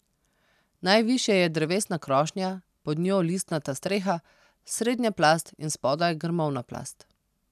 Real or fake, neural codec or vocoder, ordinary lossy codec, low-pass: real; none; none; 14.4 kHz